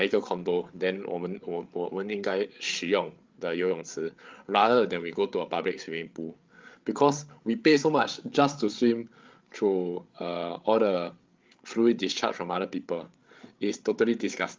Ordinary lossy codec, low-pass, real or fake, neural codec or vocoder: Opus, 32 kbps; 7.2 kHz; fake; codec, 16 kHz, 8 kbps, FreqCodec, larger model